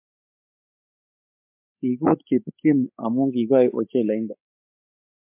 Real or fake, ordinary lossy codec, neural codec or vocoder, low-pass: fake; MP3, 32 kbps; codec, 16 kHz, 8 kbps, FreqCodec, larger model; 3.6 kHz